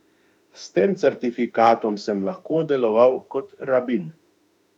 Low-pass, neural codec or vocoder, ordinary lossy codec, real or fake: 19.8 kHz; autoencoder, 48 kHz, 32 numbers a frame, DAC-VAE, trained on Japanese speech; none; fake